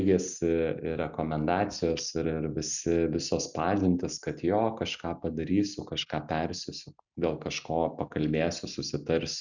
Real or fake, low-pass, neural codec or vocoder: real; 7.2 kHz; none